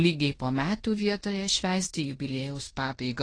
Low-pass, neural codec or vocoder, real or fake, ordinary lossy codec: 9.9 kHz; codec, 16 kHz in and 24 kHz out, 0.9 kbps, LongCat-Audio-Codec, fine tuned four codebook decoder; fake; AAC, 32 kbps